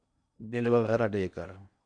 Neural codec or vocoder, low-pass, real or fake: codec, 16 kHz in and 24 kHz out, 0.8 kbps, FocalCodec, streaming, 65536 codes; 9.9 kHz; fake